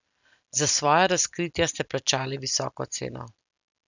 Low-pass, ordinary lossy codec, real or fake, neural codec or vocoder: 7.2 kHz; none; real; none